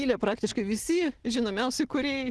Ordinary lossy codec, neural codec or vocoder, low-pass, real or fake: Opus, 16 kbps; none; 10.8 kHz; real